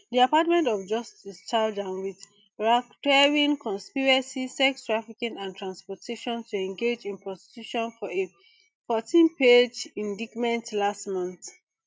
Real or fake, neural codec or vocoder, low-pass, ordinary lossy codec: real; none; none; none